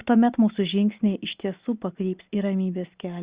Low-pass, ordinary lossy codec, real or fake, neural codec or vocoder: 3.6 kHz; Opus, 64 kbps; real; none